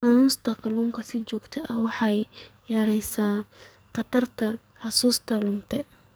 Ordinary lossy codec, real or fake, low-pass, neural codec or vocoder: none; fake; none; codec, 44.1 kHz, 2.6 kbps, SNAC